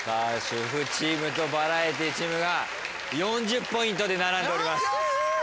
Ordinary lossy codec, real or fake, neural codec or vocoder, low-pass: none; real; none; none